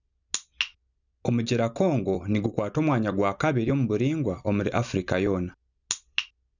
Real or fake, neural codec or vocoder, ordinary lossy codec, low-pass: real; none; none; 7.2 kHz